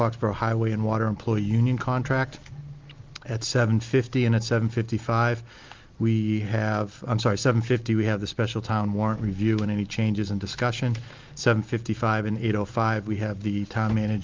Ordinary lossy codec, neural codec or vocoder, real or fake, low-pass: Opus, 32 kbps; none; real; 7.2 kHz